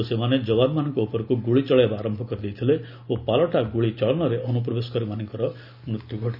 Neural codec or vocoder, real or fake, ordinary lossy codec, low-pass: none; real; none; 5.4 kHz